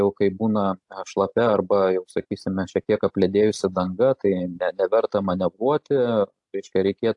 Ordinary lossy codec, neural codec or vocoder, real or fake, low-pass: AAC, 64 kbps; none; real; 10.8 kHz